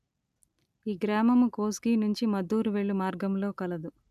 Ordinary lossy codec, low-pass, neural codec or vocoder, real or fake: none; 14.4 kHz; none; real